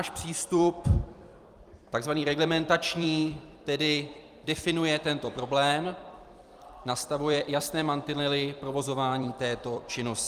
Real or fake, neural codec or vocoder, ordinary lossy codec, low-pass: fake; vocoder, 44.1 kHz, 128 mel bands every 256 samples, BigVGAN v2; Opus, 32 kbps; 14.4 kHz